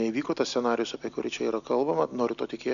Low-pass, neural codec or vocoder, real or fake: 7.2 kHz; none; real